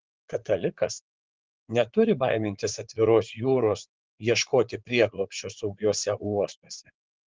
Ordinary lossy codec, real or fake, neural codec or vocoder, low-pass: Opus, 16 kbps; fake; vocoder, 44.1 kHz, 80 mel bands, Vocos; 7.2 kHz